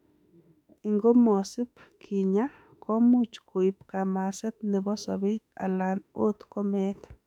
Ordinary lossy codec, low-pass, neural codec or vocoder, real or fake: none; 19.8 kHz; autoencoder, 48 kHz, 32 numbers a frame, DAC-VAE, trained on Japanese speech; fake